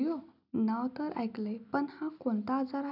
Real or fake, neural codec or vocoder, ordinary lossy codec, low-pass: real; none; none; 5.4 kHz